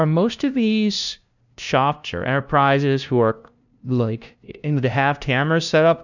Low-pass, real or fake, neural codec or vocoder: 7.2 kHz; fake; codec, 16 kHz, 0.5 kbps, FunCodec, trained on LibriTTS, 25 frames a second